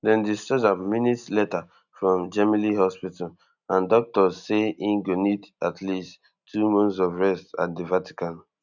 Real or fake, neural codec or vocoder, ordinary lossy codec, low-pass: real; none; none; 7.2 kHz